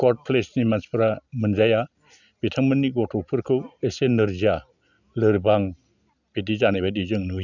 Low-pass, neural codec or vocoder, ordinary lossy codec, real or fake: 7.2 kHz; none; none; real